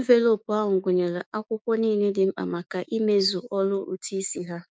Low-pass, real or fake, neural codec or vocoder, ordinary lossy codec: none; fake; codec, 16 kHz, 6 kbps, DAC; none